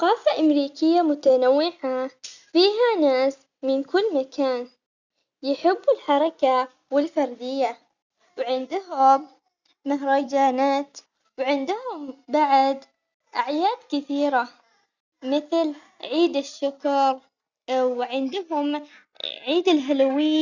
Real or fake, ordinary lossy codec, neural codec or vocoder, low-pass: real; Opus, 64 kbps; none; 7.2 kHz